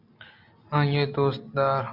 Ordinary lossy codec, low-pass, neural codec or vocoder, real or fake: MP3, 48 kbps; 5.4 kHz; none; real